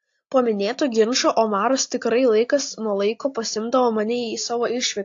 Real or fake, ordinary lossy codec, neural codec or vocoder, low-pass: real; AAC, 48 kbps; none; 7.2 kHz